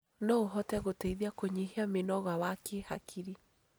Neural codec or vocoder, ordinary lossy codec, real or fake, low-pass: none; none; real; none